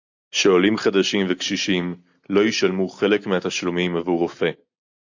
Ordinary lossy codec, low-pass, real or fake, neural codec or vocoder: AAC, 48 kbps; 7.2 kHz; real; none